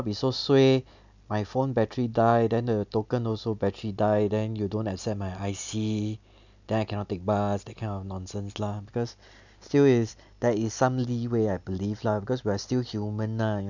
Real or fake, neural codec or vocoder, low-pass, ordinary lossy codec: real; none; 7.2 kHz; none